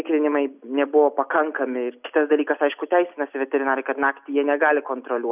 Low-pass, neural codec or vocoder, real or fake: 3.6 kHz; none; real